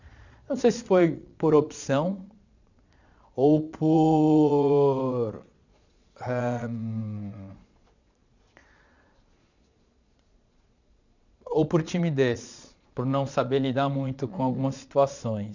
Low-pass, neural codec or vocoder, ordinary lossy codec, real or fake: 7.2 kHz; vocoder, 22.05 kHz, 80 mel bands, Vocos; none; fake